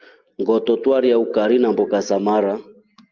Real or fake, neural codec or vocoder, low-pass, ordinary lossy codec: real; none; 7.2 kHz; Opus, 24 kbps